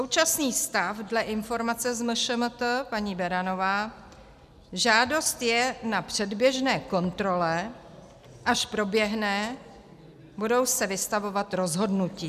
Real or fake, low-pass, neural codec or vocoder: real; 14.4 kHz; none